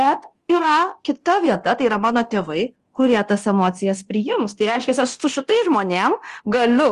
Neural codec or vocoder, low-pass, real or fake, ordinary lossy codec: codec, 24 kHz, 0.9 kbps, DualCodec; 10.8 kHz; fake; Opus, 24 kbps